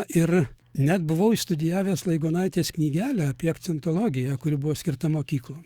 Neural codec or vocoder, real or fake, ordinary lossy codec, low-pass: codec, 44.1 kHz, 7.8 kbps, DAC; fake; Opus, 64 kbps; 19.8 kHz